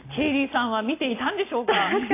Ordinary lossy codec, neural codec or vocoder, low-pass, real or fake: AAC, 24 kbps; none; 3.6 kHz; real